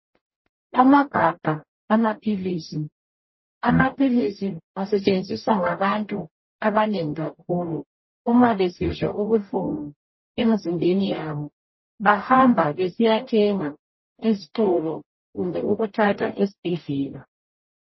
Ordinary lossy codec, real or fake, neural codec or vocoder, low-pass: MP3, 24 kbps; fake; codec, 44.1 kHz, 0.9 kbps, DAC; 7.2 kHz